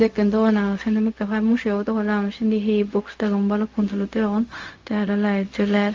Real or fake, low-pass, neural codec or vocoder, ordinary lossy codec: fake; 7.2 kHz; codec, 16 kHz, 0.4 kbps, LongCat-Audio-Codec; Opus, 32 kbps